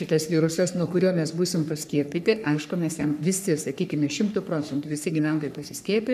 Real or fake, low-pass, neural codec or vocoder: fake; 14.4 kHz; codec, 44.1 kHz, 3.4 kbps, Pupu-Codec